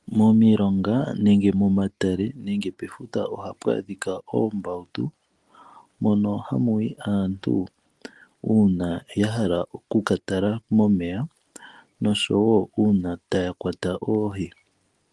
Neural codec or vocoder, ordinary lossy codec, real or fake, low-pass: none; Opus, 24 kbps; real; 10.8 kHz